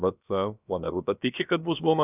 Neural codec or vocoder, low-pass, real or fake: codec, 16 kHz, about 1 kbps, DyCAST, with the encoder's durations; 3.6 kHz; fake